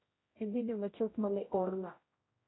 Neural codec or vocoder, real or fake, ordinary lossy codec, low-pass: codec, 16 kHz, 0.5 kbps, X-Codec, HuBERT features, trained on general audio; fake; AAC, 16 kbps; 7.2 kHz